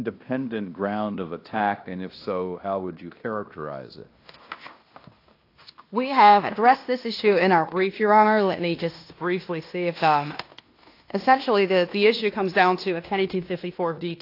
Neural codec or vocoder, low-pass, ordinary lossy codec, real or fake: codec, 16 kHz in and 24 kHz out, 0.9 kbps, LongCat-Audio-Codec, fine tuned four codebook decoder; 5.4 kHz; AAC, 32 kbps; fake